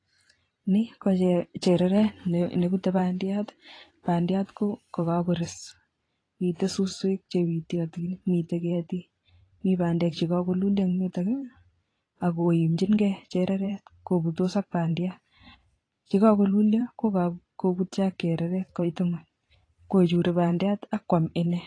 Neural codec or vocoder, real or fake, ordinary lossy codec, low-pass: vocoder, 44.1 kHz, 128 mel bands every 512 samples, BigVGAN v2; fake; AAC, 32 kbps; 9.9 kHz